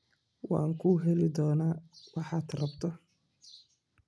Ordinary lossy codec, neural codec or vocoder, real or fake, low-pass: none; none; real; none